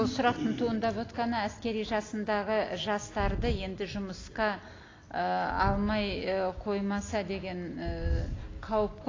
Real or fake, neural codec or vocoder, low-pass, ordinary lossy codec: real; none; 7.2 kHz; AAC, 32 kbps